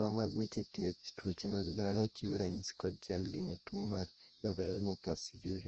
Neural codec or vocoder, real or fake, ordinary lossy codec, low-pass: codec, 16 kHz, 1 kbps, FreqCodec, larger model; fake; Opus, 24 kbps; 7.2 kHz